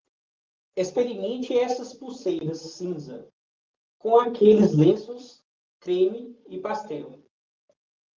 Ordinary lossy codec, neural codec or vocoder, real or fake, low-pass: Opus, 24 kbps; none; real; 7.2 kHz